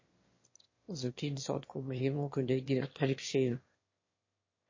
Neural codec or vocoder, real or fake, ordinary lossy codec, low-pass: autoencoder, 22.05 kHz, a latent of 192 numbers a frame, VITS, trained on one speaker; fake; MP3, 32 kbps; 7.2 kHz